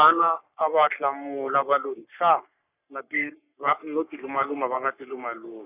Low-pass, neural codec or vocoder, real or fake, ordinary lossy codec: 3.6 kHz; codec, 44.1 kHz, 3.4 kbps, Pupu-Codec; fake; none